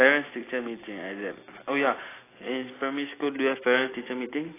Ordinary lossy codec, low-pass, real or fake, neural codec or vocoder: AAC, 16 kbps; 3.6 kHz; real; none